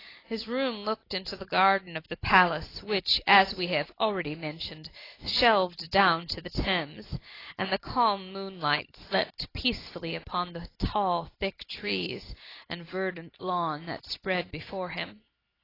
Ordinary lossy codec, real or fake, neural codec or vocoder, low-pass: AAC, 24 kbps; real; none; 5.4 kHz